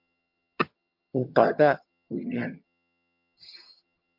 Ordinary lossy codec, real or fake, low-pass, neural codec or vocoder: MP3, 48 kbps; fake; 5.4 kHz; vocoder, 22.05 kHz, 80 mel bands, HiFi-GAN